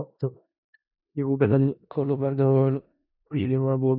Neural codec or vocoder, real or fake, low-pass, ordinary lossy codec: codec, 16 kHz in and 24 kHz out, 0.4 kbps, LongCat-Audio-Codec, four codebook decoder; fake; 5.4 kHz; none